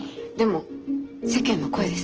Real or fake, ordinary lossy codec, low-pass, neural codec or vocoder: real; Opus, 16 kbps; 7.2 kHz; none